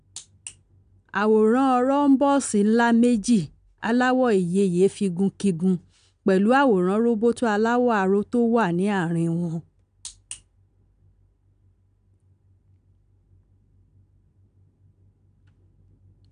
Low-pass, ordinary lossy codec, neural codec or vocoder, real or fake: 9.9 kHz; none; none; real